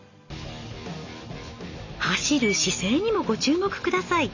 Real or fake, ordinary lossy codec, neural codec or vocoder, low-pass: real; none; none; 7.2 kHz